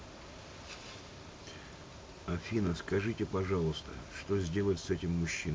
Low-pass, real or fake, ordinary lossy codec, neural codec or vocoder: none; real; none; none